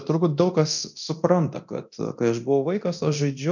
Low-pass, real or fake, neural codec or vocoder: 7.2 kHz; fake; codec, 24 kHz, 0.9 kbps, DualCodec